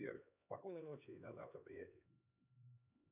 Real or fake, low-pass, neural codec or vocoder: fake; 3.6 kHz; codec, 16 kHz, 2 kbps, X-Codec, HuBERT features, trained on LibriSpeech